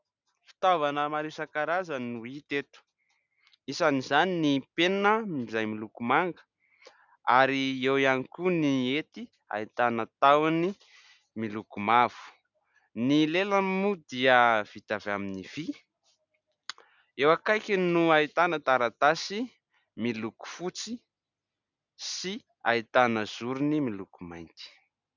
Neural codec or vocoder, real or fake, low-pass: none; real; 7.2 kHz